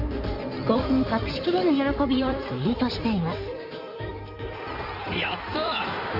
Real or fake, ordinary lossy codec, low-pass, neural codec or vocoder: fake; none; 5.4 kHz; codec, 16 kHz in and 24 kHz out, 2.2 kbps, FireRedTTS-2 codec